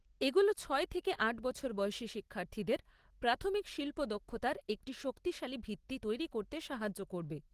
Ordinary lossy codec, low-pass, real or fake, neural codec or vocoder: Opus, 16 kbps; 14.4 kHz; real; none